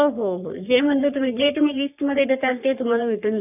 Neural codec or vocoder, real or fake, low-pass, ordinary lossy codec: codec, 44.1 kHz, 3.4 kbps, Pupu-Codec; fake; 3.6 kHz; none